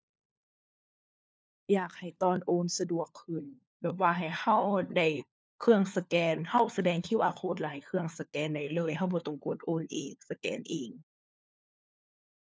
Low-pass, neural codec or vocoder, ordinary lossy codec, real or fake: none; codec, 16 kHz, 4 kbps, FunCodec, trained on LibriTTS, 50 frames a second; none; fake